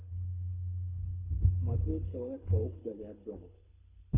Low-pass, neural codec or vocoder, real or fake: 3.6 kHz; codec, 24 kHz, 6 kbps, HILCodec; fake